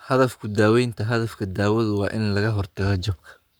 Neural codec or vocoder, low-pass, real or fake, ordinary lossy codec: codec, 44.1 kHz, 7.8 kbps, Pupu-Codec; none; fake; none